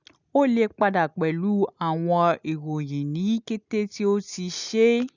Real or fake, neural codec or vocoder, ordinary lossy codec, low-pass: real; none; none; 7.2 kHz